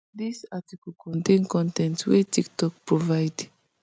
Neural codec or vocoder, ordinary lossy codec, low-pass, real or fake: none; none; none; real